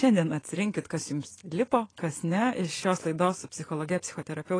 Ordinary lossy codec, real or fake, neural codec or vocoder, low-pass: AAC, 32 kbps; fake; vocoder, 24 kHz, 100 mel bands, Vocos; 9.9 kHz